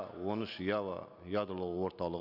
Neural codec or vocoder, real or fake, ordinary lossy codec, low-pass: none; real; none; 5.4 kHz